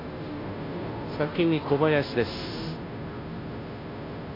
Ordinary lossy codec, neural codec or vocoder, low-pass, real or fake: AAC, 24 kbps; codec, 16 kHz, 0.5 kbps, FunCodec, trained on Chinese and English, 25 frames a second; 5.4 kHz; fake